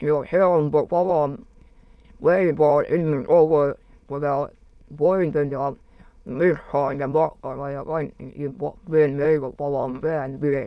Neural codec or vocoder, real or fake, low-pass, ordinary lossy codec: autoencoder, 22.05 kHz, a latent of 192 numbers a frame, VITS, trained on many speakers; fake; none; none